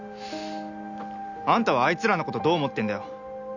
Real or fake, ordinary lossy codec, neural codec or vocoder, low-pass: real; none; none; 7.2 kHz